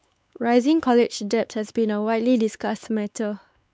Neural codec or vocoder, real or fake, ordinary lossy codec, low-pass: codec, 16 kHz, 4 kbps, X-Codec, WavLM features, trained on Multilingual LibriSpeech; fake; none; none